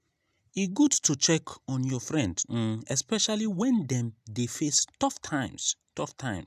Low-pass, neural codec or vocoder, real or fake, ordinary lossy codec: 14.4 kHz; none; real; none